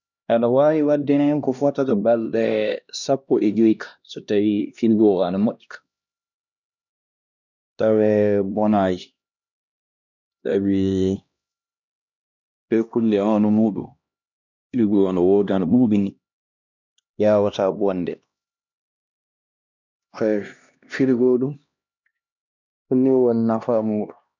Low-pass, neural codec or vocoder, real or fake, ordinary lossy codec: 7.2 kHz; codec, 16 kHz, 1 kbps, X-Codec, HuBERT features, trained on LibriSpeech; fake; none